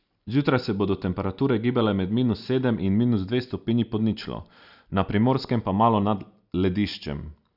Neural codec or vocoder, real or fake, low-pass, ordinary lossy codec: none; real; 5.4 kHz; none